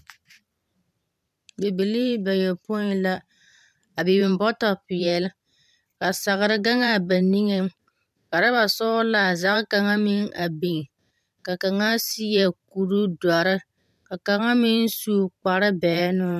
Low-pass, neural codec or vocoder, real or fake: 14.4 kHz; vocoder, 44.1 kHz, 128 mel bands every 512 samples, BigVGAN v2; fake